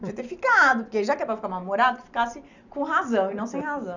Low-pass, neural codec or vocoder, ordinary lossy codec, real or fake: 7.2 kHz; none; none; real